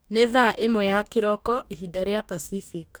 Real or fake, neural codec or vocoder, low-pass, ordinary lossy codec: fake; codec, 44.1 kHz, 2.6 kbps, DAC; none; none